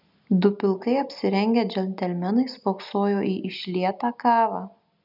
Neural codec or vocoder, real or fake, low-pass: none; real; 5.4 kHz